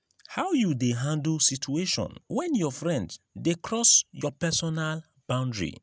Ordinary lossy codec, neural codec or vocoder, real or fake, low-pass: none; none; real; none